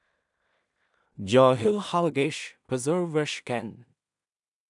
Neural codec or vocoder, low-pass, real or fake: codec, 16 kHz in and 24 kHz out, 0.4 kbps, LongCat-Audio-Codec, two codebook decoder; 10.8 kHz; fake